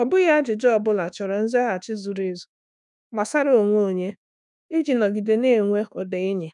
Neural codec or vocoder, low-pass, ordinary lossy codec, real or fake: codec, 24 kHz, 1.2 kbps, DualCodec; 10.8 kHz; none; fake